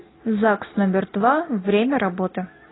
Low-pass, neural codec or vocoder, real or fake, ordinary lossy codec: 7.2 kHz; none; real; AAC, 16 kbps